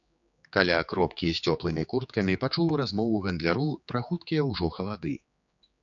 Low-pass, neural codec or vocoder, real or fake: 7.2 kHz; codec, 16 kHz, 4 kbps, X-Codec, HuBERT features, trained on general audio; fake